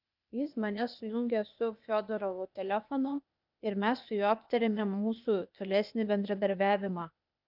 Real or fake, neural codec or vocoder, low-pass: fake; codec, 16 kHz, 0.8 kbps, ZipCodec; 5.4 kHz